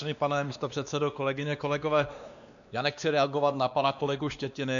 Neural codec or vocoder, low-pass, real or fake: codec, 16 kHz, 2 kbps, X-Codec, WavLM features, trained on Multilingual LibriSpeech; 7.2 kHz; fake